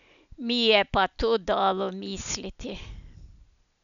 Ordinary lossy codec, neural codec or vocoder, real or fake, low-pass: none; none; real; 7.2 kHz